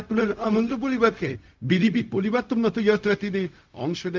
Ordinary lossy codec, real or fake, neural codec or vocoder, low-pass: Opus, 32 kbps; fake; codec, 16 kHz, 0.4 kbps, LongCat-Audio-Codec; 7.2 kHz